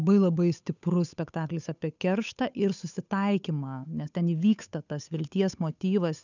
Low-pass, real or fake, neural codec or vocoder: 7.2 kHz; fake; codec, 16 kHz, 8 kbps, FunCodec, trained on Chinese and English, 25 frames a second